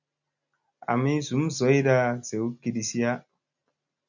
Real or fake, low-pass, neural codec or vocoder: real; 7.2 kHz; none